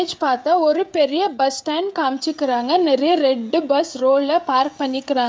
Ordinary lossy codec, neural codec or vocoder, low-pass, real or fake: none; codec, 16 kHz, 16 kbps, FreqCodec, smaller model; none; fake